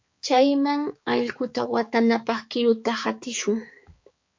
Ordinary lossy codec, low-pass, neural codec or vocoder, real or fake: MP3, 48 kbps; 7.2 kHz; codec, 16 kHz, 4 kbps, X-Codec, HuBERT features, trained on balanced general audio; fake